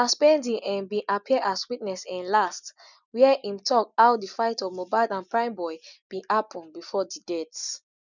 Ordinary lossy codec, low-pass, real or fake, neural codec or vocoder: none; 7.2 kHz; real; none